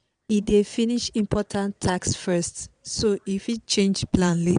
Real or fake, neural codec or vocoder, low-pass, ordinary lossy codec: fake; vocoder, 22.05 kHz, 80 mel bands, Vocos; 9.9 kHz; none